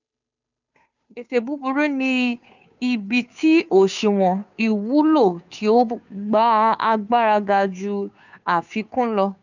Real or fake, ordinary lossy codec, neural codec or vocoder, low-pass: fake; none; codec, 16 kHz, 2 kbps, FunCodec, trained on Chinese and English, 25 frames a second; 7.2 kHz